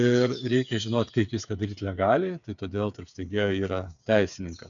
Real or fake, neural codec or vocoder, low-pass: fake; codec, 16 kHz, 8 kbps, FreqCodec, smaller model; 7.2 kHz